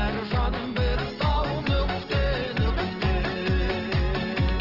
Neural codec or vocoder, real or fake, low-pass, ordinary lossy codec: none; real; 5.4 kHz; Opus, 16 kbps